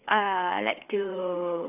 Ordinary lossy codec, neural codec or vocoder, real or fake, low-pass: none; codec, 16 kHz, 4 kbps, FreqCodec, larger model; fake; 3.6 kHz